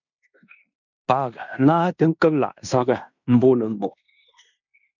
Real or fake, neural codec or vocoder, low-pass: fake; codec, 16 kHz in and 24 kHz out, 0.9 kbps, LongCat-Audio-Codec, fine tuned four codebook decoder; 7.2 kHz